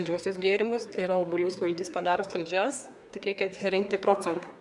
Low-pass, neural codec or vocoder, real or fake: 10.8 kHz; codec, 24 kHz, 1 kbps, SNAC; fake